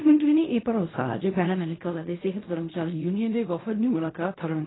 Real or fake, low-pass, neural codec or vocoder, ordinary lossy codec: fake; 7.2 kHz; codec, 16 kHz in and 24 kHz out, 0.4 kbps, LongCat-Audio-Codec, fine tuned four codebook decoder; AAC, 16 kbps